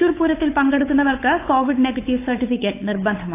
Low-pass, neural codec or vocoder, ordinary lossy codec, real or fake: 3.6 kHz; codec, 16 kHz, 8 kbps, FunCodec, trained on Chinese and English, 25 frames a second; AAC, 24 kbps; fake